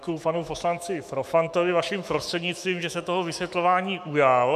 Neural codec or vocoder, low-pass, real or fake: autoencoder, 48 kHz, 128 numbers a frame, DAC-VAE, trained on Japanese speech; 14.4 kHz; fake